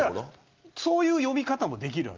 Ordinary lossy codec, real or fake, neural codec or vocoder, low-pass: Opus, 32 kbps; real; none; 7.2 kHz